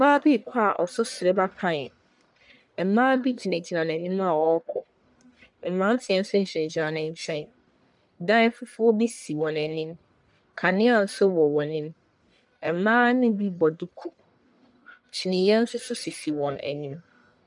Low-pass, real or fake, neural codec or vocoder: 10.8 kHz; fake; codec, 44.1 kHz, 1.7 kbps, Pupu-Codec